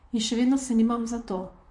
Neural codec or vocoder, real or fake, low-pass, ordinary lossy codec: vocoder, 44.1 kHz, 128 mel bands, Pupu-Vocoder; fake; 10.8 kHz; MP3, 48 kbps